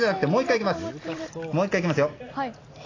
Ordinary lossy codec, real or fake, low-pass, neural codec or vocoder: AAC, 32 kbps; real; 7.2 kHz; none